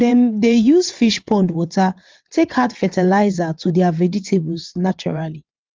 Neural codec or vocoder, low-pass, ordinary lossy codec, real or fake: vocoder, 22.05 kHz, 80 mel bands, WaveNeXt; 7.2 kHz; Opus, 32 kbps; fake